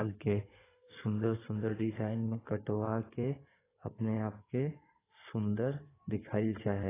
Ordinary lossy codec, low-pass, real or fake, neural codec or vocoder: AAC, 16 kbps; 3.6 kHz; fake; codec, 16 kHz in and 24 kHz out, 2.2 kbps, FireRedTTS-2 codec